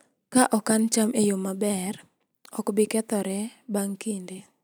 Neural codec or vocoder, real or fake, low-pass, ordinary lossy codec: none; real; none; none